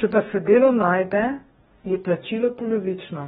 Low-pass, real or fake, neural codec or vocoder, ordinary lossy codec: 19.8 kHz; fake; codec, 44.1 kHz, 2.6 kbps, DAC; AAC, 16 kbps